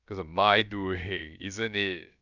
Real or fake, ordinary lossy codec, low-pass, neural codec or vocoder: fake; none; 7.2 kHz; codec, 16 kHz, about 1 kbps, DyCAST, with the encoder's durations